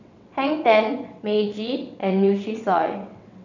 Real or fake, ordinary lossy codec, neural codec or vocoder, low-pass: fake; none; vocoder, 22.05 kHz, 80 mel bands, WaveNeXt; 7.2 kHz